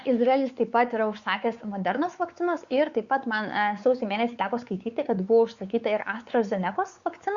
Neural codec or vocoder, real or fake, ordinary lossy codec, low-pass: codec, 16 kHz, 4 kbps, X-Codec, WavLM features, trained on Multilingual LibriSpeech; fake; Opus, 64 kbps; 7.2 kHz